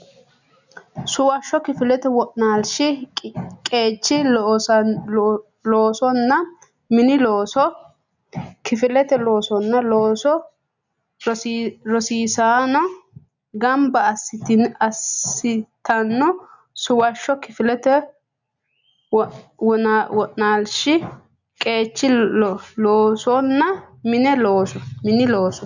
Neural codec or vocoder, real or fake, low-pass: none; real; 7.2 kHz